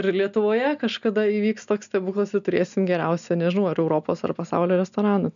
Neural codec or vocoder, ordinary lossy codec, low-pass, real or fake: none; MP3, 96 kbps; 7.2 kHz; real